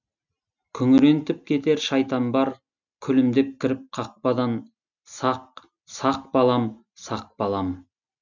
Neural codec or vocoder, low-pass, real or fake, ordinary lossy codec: none; 7.2 kHz; real; none